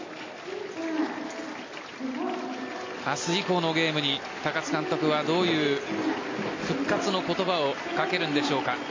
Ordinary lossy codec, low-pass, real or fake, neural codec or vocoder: MP3, 32 kbps; 7.2 kHz; real; none